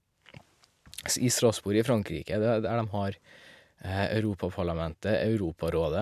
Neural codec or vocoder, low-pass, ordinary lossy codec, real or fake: none; 14.4 kHz; MP3, 96 kbps; real